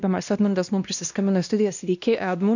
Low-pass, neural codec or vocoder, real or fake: 7.2 kHz; codec, 16 kHz, 1 kbps, X-Codec, WavLM features, trained on Multilingual LibriSpeech; fake